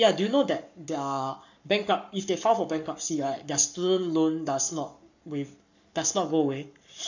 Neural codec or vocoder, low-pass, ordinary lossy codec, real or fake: codec, 44.1 kHz, 7.8 kbps, Pupu-Codec; 7.2 kHz; none; fake